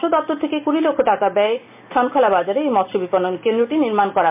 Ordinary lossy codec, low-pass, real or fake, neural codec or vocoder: MP3, 32 kbps; 3.6 kHz; real; none